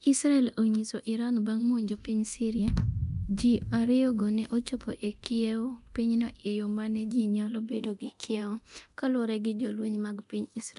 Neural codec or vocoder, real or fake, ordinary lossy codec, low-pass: codec, 24 kHz, 0.9 kbps, DualCodec; fake; none; 10.8 kHz